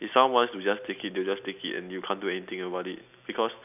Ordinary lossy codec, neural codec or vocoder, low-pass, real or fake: none; none; 3.6 kHz; real